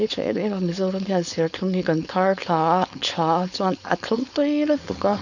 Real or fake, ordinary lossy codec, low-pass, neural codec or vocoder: fake; none; 7.2 kHz; codec, 16 kHz, 4.8 kbps, FACodec